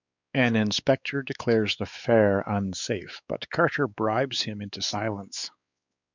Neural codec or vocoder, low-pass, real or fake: codec, 16 kHz, 4 kbps, X-Codec, WavLM features, trained on Multilingual LibriSpeech; 7.2 kHz; fake